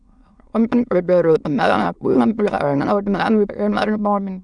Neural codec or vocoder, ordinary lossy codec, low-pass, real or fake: autoencoder, 22.05 kHz, a latent of 192 numbers a frame, VITS, trained on many speakers; none; 9.9 kHz; fake